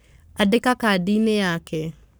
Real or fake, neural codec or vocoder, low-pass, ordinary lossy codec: fake; codec, 44.1 kHz, 7.8 kbps, Pupu-Codec; none; none